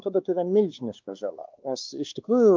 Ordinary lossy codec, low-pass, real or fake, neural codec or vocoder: Opus, 32 kbps; 7.2 kHz; fake; codec, 16 kHz, 2 kbps, X-Codec, WavLM features, trained on Multilingual LibriSpeech